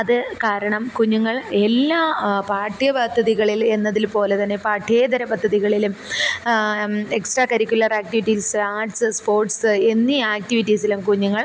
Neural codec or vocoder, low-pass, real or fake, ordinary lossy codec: none; none; real; none